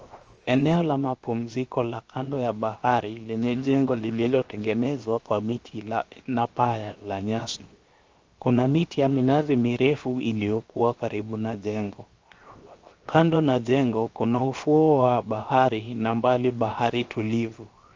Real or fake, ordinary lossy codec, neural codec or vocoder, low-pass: fake; Opus, 24 kbps; codec, 16 kHz, 0.7 kbps, FocalCodec; 7.2 kHz